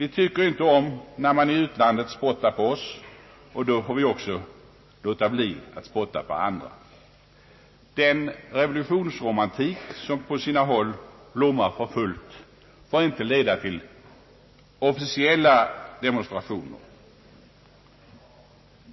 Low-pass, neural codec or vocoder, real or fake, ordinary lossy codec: 7.2 kHz; none; real; MP3, 24 kbps